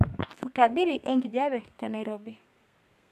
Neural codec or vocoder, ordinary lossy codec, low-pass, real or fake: codec, 32 kHz, 1.9 kbps, SNAC; none; 14.4 kHz; fake